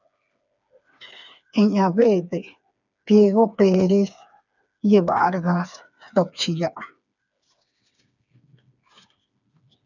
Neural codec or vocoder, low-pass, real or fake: codec, 16 kHz, 4 kbps, FreqCodec, smaller model; 7.2 kHz; fake